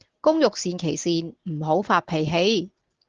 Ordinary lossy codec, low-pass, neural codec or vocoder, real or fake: Opus, 16 kbps; 7.2 kHz; none; real